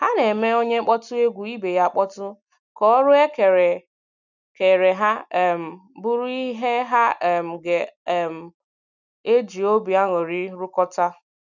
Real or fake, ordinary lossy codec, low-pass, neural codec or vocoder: real; none; 7.2 kHz; none